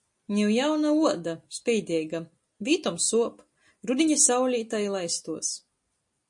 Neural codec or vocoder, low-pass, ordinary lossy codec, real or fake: none; 10.8 kHz; MP3, 48 kbps; real